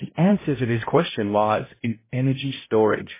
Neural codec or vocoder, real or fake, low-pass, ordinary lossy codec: codec, 16 kHz, 0.5 kbps, X-Codec, HuBERT features, trained on balanced general audio; fake; 3.6 kHz; MP3, 16 kbps